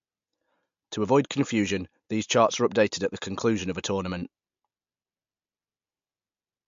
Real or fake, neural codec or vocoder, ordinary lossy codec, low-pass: real; none; MP3, 48 kbps; 7.2 kHz